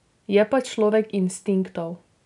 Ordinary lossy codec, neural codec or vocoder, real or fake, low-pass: none; none; real; 10.8 kHz